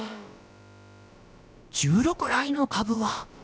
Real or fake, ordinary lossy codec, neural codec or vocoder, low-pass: fake; none; codec, 16 kHz, about 1 kbps, DyCAST, with the encoder's durations; none